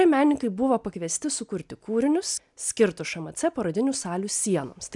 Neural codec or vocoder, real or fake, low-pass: none; real; 10.8 kHz